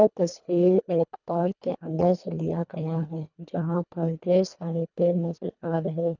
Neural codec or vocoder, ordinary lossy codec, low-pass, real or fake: codec, 24 kHz, 1.5 kbps, HILCodec; none; 7.2 kHz; fake